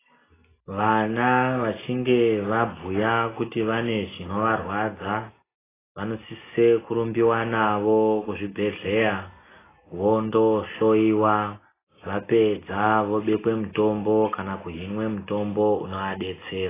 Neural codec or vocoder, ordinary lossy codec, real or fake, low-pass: none; AAC, 16 kbps; real; 3.6 kHz